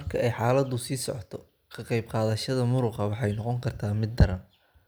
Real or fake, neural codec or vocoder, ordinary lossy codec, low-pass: real; none; none; none